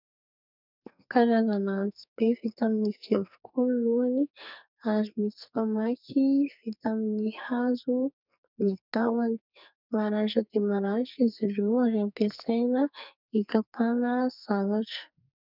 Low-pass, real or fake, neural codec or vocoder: 5.4 kHz; fake; codec, 44.1 kHz, 2.6 kbps, SNAC